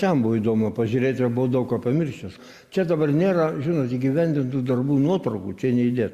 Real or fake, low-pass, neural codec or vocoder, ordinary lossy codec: real; 14.4 kHz; none; Opus, 64 kbps